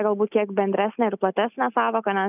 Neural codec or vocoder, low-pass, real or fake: none; 3.6 kHz; real